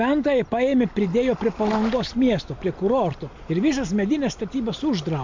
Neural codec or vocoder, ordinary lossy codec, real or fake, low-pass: none; MP3, 48 kbps; real; 7.2 kHz